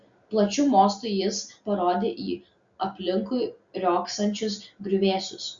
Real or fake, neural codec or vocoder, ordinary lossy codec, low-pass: real; none; Opus, 64 kbps; 7.2 kHz